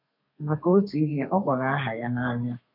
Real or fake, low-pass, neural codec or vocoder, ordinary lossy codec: fake; 5.4 kHz; codec, 32 kHz, 1.9 kbps, SNAC; none